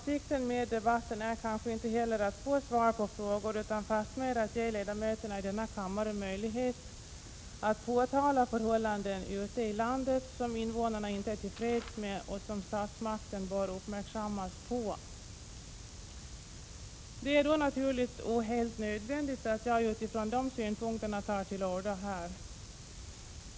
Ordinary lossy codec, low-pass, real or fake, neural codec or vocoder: none; none; real; none